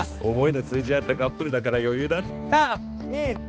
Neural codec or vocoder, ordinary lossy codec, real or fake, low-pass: codec, 16 kHz, 2 kbps, X-Codec, HuBERT features, trained on balanced general audio; none; fake; none